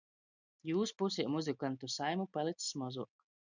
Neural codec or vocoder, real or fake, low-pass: none; real; 7.2 kHz